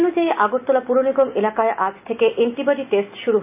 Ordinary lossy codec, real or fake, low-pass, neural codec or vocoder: none; real; 3.6 kHz; none